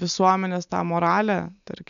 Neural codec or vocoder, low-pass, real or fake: none; 7.2 kHz; real